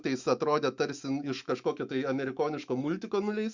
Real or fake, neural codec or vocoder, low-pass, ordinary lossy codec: fake; autoencoder, 48 kHz, 128 numbers a frame, DAC-VAE, trained on Japanese speech; 7.2 kHz; Opus, 64 kbps